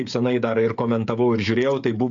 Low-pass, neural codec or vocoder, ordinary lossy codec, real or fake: 7.2 kHz; codec, 16 kHz, 16 kbps, FreqCodec, smaller model; AAC, 64 kbps; fake